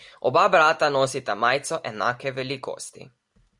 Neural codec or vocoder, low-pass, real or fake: none; 10.8 kHz; real